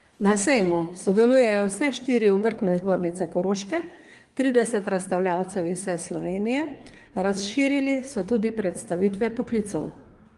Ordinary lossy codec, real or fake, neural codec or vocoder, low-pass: Opus, 32 kbps; fake; codec, 24 kHz, 1 kbps, SNAC; 10.8 kHz